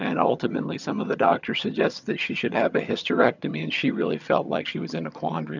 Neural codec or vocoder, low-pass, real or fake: vocoder, 22.05 kHz, 80 mel bands, HiFi-GAN; 7.2 kHz; fake